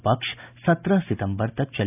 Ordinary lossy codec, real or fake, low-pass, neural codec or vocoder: none; real; 3.6 kHz; none